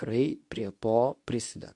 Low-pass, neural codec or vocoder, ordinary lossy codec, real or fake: 10.8 kHz; codec, 24 kHz, 0.9 kbps, WavTokenizer, medium speech release version 2; MP3, 64 kbps; fake